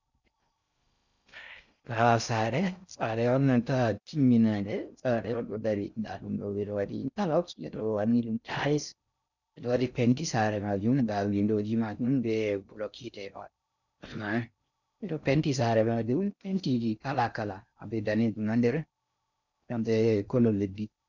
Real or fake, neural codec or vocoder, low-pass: fake; codec, 16 kHz in and 24 kHz out, 0.6 kbps, FocalCodec, streaming, 4096 codes; 7.2 kHz